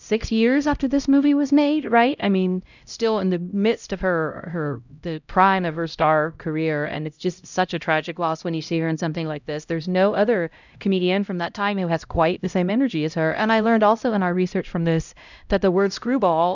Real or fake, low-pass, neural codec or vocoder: fake; 7.2 kHz; codec, 16 kHz, 0.5 kbps, X-Codec, HuBERT features, trained on LibriSpeech